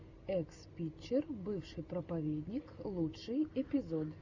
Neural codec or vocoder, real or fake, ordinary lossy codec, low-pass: none; real; Opus, 64 kbps; 7.2 kHz